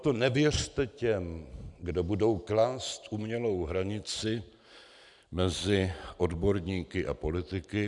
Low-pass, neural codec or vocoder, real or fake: 10.8 kHz; none; real